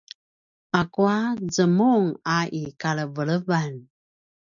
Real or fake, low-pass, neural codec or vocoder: real; 7.2 kHz; none